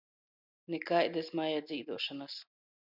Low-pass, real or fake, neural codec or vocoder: 5.4 kHz; real; none